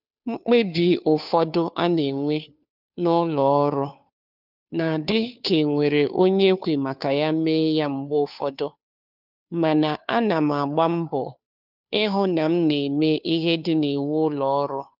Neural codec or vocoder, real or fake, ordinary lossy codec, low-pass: codec, 16 kHz, 2 kbps, FunCodec, trained on Chinese and English, 25 frames a second; fake; none; 5.4 kHz